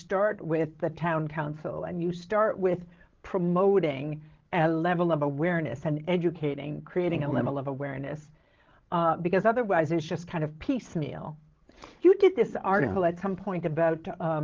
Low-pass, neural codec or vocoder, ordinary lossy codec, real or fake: 7.2 kHz; codec, 16 kHz, 16 kbps, FreqCodec, larger model; Opus, 16 kbps; fake